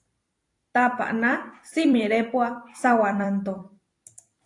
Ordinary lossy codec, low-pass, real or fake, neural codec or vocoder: AAC, 48 kbps; 10.8 kHz; fake; vocoder, 44.1 kHz, 128 mel bands every 256 samples, BigVGAN v2